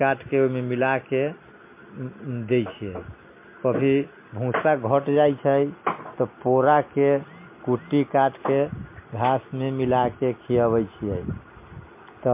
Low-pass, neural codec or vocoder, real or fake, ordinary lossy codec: 3.6 kHz; none; real; MP3, 24 kbps